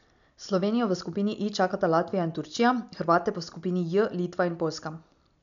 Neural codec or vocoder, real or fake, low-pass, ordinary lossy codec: none; real; 7.2 kHz; none